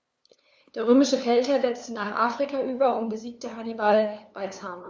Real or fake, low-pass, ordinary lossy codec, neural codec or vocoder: fake; none; none; codec, 16 kHz, 2 kbps, FunCodec, trained on LibriTTS, 25 frames a second